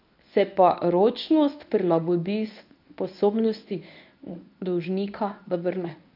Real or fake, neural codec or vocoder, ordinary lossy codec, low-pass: fake; codec, 24 kHz, 0.9 kbps, WavTokenizer, medium speech release version 1; none; 5.4 kHz